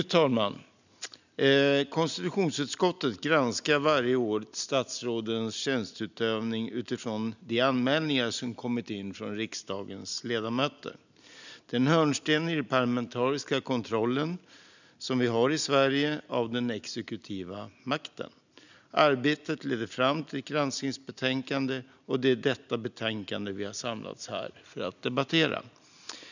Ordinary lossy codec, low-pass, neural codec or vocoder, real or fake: none; 7.2 kHz; none; real